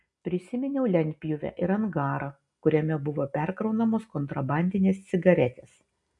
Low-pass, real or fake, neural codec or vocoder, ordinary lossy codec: 10.8 kHz; real; none; AAC, 64 kbps